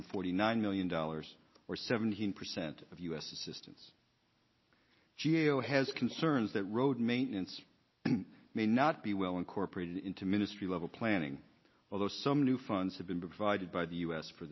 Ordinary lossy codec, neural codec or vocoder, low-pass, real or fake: MP3, 24 kbps; none; 7.2 kHz; real